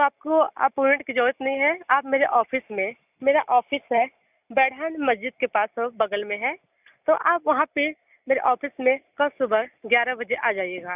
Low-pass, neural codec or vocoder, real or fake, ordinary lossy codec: 3.6 kHz; none; real; none